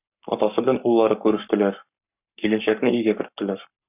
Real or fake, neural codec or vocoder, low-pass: fake; codec, 44.1 kHz, 7.8 kbps, Pupu-Codec; 3.6 kHz